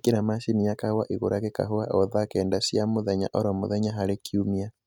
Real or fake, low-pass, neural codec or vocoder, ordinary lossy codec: real; 19.8 kHz; none; none